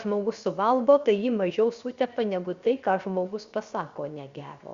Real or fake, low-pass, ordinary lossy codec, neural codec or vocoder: fake; 7.2 kHz; Opus, 64 kbps; codec, 16 kHz, 0.7 kbps, FocalCodec